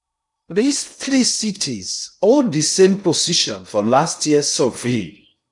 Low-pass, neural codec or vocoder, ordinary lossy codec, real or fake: 10.8 kHz; codec, 16 kHz in and 24 kHz out, 0.6 kbps, FocalCodec, streaming, 2048 codes; none; fake